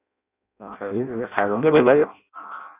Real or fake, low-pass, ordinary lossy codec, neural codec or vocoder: fake; 3.6 kHz; none; codec, 16 kHz in and 24 kHz out, 0.6 kbps, FireRedTTS-2 codec